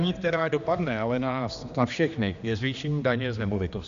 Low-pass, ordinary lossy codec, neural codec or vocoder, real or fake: 7.2 kHz; Opus, 64 kbps; codec, 16 kHz, 2 kbps, X-Codec, HuBERT features, trained on general audio; fake